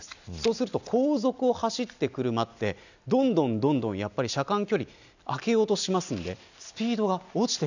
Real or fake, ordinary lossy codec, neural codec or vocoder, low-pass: real; none; none; 7.2 kHz